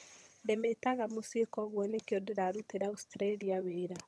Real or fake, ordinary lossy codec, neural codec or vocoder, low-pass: fake; none; vocoder, 22.05 kHz, 80 mel bands, HiFi-GAN; none